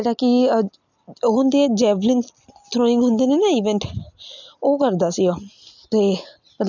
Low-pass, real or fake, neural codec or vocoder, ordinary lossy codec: 7.2 kHz; real; none; none